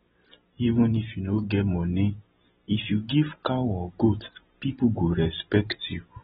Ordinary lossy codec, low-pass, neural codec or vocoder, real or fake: AAC, 16 kbps; 19.8 kHz; none; real